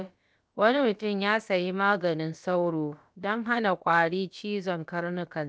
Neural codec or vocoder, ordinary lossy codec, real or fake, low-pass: codec, 16 kHz, about 1 kbps, DyCAST, with the encoder's durations; none; fake; none